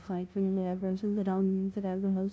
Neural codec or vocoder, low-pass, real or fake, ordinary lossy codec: codec, 16 kHz, 0.5 kbps, FunCodec, trained on LibriTTS, 25 frames a second; none; fake; none